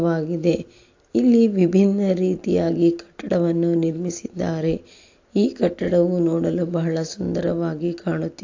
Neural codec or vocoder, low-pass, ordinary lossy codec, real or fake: none; 7.2 kHz; AAC, 48 kbps; real